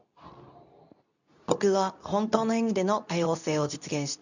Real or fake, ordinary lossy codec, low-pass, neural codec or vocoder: fake; none; 7.2 kHz; codec, 24 kHz, 0.9 kbps, WavTokenizer, medium speech release version 2